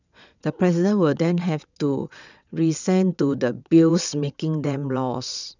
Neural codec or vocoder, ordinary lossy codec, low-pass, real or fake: vocoder, 22.05 kHz, 80 mel bands, WaveNeXt; none; 7.2 kHz; fake